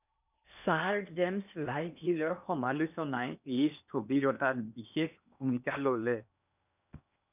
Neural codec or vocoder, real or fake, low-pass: codec, 16 kHz in and 24 kHz out, 0.8 kbps, FocalCodec, streaming, 65536 codes; fake; 3.6 kHz